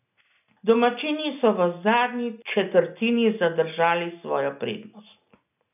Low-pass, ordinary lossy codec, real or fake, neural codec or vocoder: 3.6 kHz; none; real; none